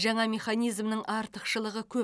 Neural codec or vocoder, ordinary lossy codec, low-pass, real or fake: none; none; none; real